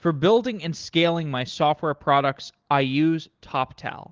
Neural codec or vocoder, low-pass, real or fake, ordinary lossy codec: none; 7.2 kHz; real; Opus, 16 kbps